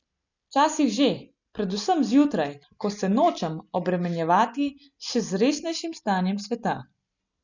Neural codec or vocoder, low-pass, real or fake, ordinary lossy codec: none; 7.2 kHz; real; none